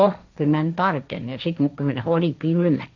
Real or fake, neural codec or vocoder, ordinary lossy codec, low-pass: fake; codec, 16 kHz, 1.1 kbps, Voila-Tokenizer; none; 7.2 kHz